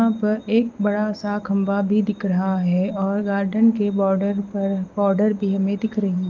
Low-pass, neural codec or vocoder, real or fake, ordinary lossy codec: 7.2 kHz; none; real; Opus, 32 kbps